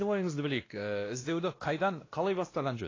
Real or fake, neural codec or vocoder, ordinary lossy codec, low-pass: fake; codec, 16 kHz, 1 kbps, X-Codec, WavLM features, trained on Multilingual LibriSpeech; AAC, 32 kbps; 7.2 kHz